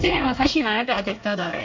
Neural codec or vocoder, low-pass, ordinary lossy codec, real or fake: codec, 24 kHz, 1 kbps, SNAC; 7.2 kHz; MP3, 48 kbps; fake